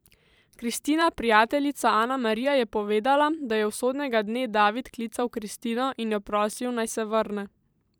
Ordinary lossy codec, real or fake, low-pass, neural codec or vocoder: none; fake; none; vocoder, 44.1 kHz, 128 mel bands every 512 samples, BigVGAN v2